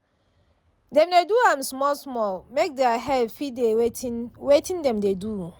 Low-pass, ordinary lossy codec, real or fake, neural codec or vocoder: none; none; real; none